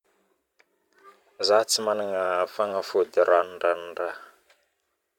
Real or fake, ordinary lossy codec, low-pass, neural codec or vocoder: fake; none; 19.8 kHz; vocoder, 44.1 kHz, 128 mel bands every 512 samples, BigVGAN v2